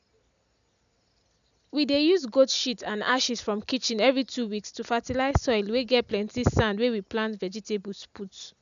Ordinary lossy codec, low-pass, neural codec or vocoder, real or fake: none; 7.2 kHz; none; real